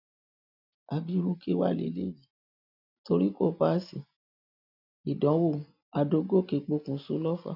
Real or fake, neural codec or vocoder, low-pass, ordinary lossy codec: real; none; 5.4 kHz; none